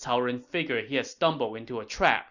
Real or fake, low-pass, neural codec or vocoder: real; 7.2 kHz; none